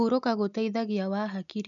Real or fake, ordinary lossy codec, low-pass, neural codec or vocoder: real; none; 7.2 kHz; none